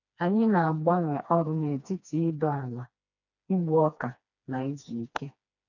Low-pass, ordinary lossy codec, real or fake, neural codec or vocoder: 7.2 kHz; none; fake; codec, 16 kHz, 2 kbps, FreqCodec, smaller model